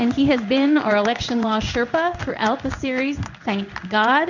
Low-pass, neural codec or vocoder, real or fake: 7.2 kHz; codec, 16 kHz in and 24 kHz out, 1 kbps, XY-Tokenizer; fake